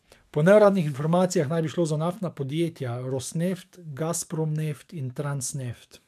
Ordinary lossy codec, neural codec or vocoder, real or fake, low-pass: MP3, 96 kbps; codec, 44.1 kHz, 7.8 kbps, DAC; fake; 14.4 kHz